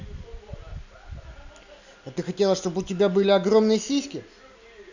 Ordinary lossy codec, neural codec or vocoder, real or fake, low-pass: none; none; real; 7.2 kHz